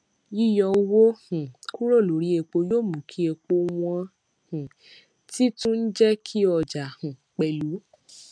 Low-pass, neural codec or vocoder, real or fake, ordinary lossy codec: 9.9 kHz; none; real; MP3, 96 kbps